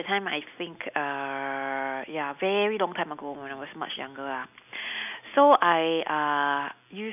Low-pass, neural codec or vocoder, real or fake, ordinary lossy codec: 3.6 kHz; none; real; none